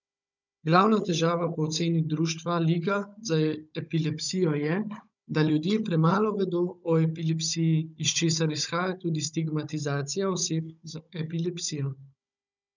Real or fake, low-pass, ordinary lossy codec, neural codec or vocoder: fake; 7.2 kHz; none; codec, 16 kHz, 16 kbps, FunCodec, trained on Chinese and English, 50 frames a second